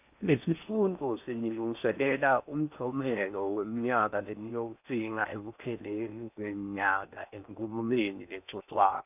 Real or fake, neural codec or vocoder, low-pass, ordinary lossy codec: fake; codec, 16 kHz in and 24 kHz out, 0.6 kbps, FocalCodec, streaming, 4096 codes; 3.6 kHz; none